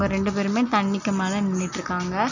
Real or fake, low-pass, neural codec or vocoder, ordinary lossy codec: real; 7.2 kHz; none; none